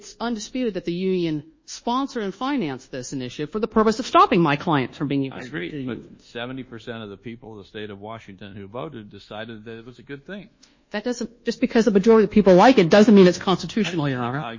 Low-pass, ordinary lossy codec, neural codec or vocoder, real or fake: 7.2 kHz; MP3, 32 kbps; codec, 24 kHz, 1.2 kbps, DualCodec; fake